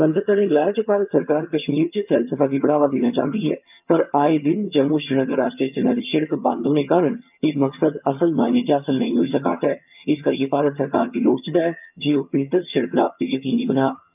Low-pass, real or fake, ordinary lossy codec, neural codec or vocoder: 3.6 kHz; fake; none; vocoder, 22.05 kHz, 80 mel bands, HiFi-GAN